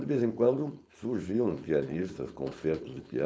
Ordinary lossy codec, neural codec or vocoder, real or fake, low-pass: none; codec, 16 kHz, 4.8 kbps, FACodec; fake; none